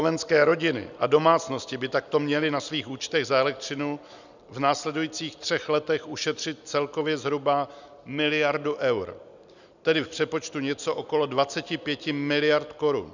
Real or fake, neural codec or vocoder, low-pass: real; none; 7.2 kHz